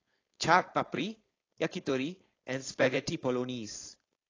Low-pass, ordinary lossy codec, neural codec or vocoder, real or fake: 7.2 kHz; AAC, 32 kbps; codec, 16 kHz, 4.8 kbps, FACodec; fake